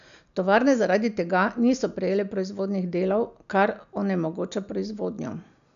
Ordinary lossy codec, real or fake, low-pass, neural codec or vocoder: none; real; 7.2 kHz; none